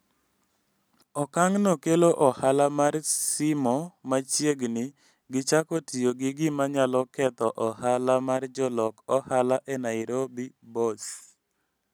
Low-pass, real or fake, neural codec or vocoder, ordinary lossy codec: none; real; none; none